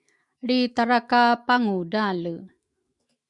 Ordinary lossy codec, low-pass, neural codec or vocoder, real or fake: Opus, 64 kbps; 10.8 kHz; autoencoder, 48 kHz, 128 numbers a frame, DAC-VAE, trained on Japanese speech; fake